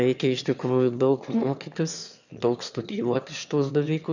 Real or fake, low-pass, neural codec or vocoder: fake; 7.2 kHz; autoencoder, 22.05 kHz, a latent of 192 numbers a frame, VITS, trained on one speaker